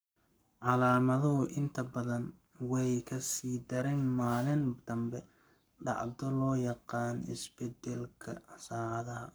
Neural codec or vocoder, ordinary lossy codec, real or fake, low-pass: codec, 44.1 kHz, 7.8 kbps, Pupu-Codec; none; fake; none